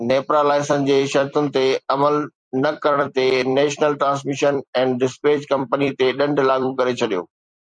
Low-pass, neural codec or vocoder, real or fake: 9.9 kHz; vocoder, 44.1 kHz, 128 mel bands every 256 samples, BigVGAN v2; fake